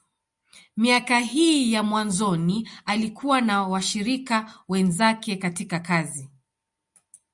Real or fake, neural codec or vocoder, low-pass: real; none; 10.8 kHz